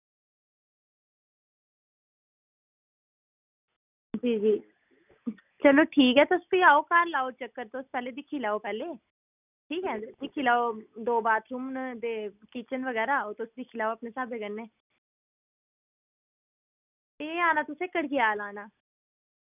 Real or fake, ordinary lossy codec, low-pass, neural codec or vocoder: real; none; 3.6 kHz; none